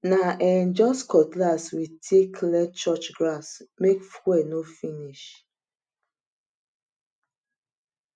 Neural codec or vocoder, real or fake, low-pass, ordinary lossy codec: none; real; 9.9 kHz; none